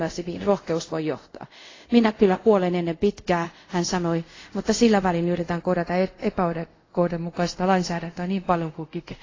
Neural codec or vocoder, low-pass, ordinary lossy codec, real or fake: codec, 24 kHz, 0.5 kbps, DualCodec; 7.2 kHz; AAC, 32 kbps; fake